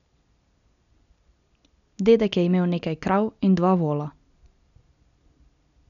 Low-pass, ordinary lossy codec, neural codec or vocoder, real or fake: 7.2 kHz; none; none; real